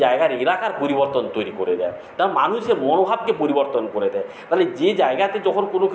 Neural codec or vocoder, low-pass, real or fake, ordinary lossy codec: none; none; real; none